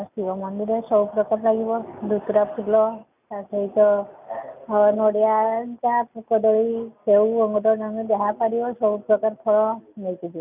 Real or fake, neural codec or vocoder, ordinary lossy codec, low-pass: real; none; none; 3.6 kHz